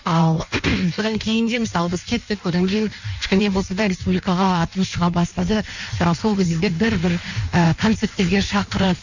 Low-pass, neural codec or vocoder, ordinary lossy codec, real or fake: 7.2 kHz; codec, 16 kHz in and 24 kHz out, 1.1 kbps, FireRedTTS-2 codec; none; fake